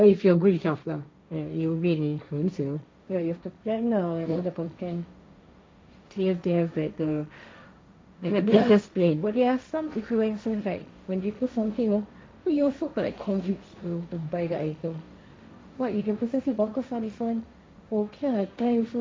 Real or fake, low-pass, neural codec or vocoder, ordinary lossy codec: fake; none; codec, 16 kHz, 1.1 kbps, Voila-Tokenizer; none